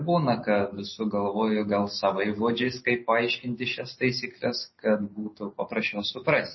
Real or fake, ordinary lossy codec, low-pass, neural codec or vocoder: real; MP3, 24 kbps; 7.2 kHz; none